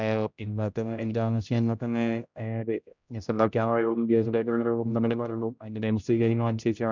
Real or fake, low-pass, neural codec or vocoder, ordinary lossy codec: fake; 7.2 kHz; codec, 16 kHz, 0.5 kbps, X-Codec, HuBERT features, trained on general audio; none